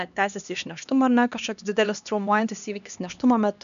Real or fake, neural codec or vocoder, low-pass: fake; codec, 16 kHz, 2 kbps, X-Codec, HuBERT features, trained on LibriSpeech; 7.2 kHz